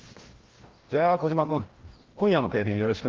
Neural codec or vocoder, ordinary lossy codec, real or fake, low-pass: codec, 16 kHz, 1 kbps, FreqCodec, larger model; Opus, 16 kbps; fake; 7.2 kHz